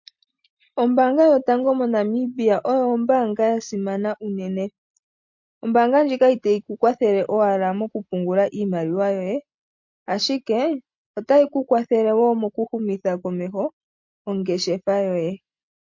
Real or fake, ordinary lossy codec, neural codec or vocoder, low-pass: real; MP3, 48 kbps; none; 7.2 kHz